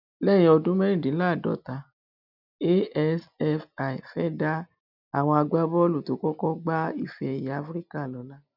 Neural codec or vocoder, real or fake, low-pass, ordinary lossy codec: none; real; 5.4 kHz; none